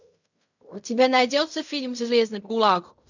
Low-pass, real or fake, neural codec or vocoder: 7.2 kHz; fake; codec, 16 kHz in and 24 kHz out, 0.4 kbps, LongCat-Audio-Codec, fine tuned four codebook decoder